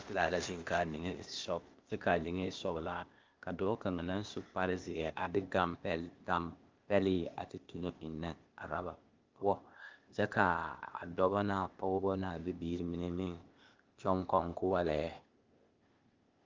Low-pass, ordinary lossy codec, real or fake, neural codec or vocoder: 7.2 kHz; Opus, 32 kbps; fake; codec, 16 kHz, 0.8 kbps, ZipCodec